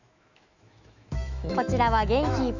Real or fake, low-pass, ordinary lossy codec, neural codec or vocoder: fake; 7.2 kHz; none; autoencoder, 48 kHz, 128 numbers a frame, DAC-VAE, trained on Japanese speech